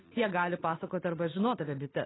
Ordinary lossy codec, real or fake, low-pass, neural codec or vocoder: AAC, 16 kbps; real; 7.2 kHz; none